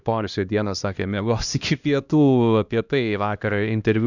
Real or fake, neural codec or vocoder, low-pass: fake; codec, 16 kHz, 1 kbps, X-Codec, HuBERT features, trained on LibriSpeech; 7.2 kHz